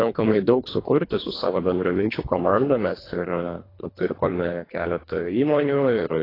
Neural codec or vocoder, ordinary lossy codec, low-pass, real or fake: codec, 24 kHz, 1.5 kbps, HILCodec; AAC, 24 kbps; 5.4 kHz; fake